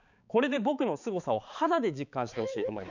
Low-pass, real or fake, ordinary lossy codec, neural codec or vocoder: 7.2 kHz; fake; none; codec, 16 kHz, 4 kbps, X-Codec, HuBERT features, trained on balanced general audio